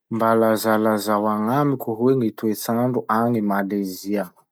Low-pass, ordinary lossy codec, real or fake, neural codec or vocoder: none; none; real; none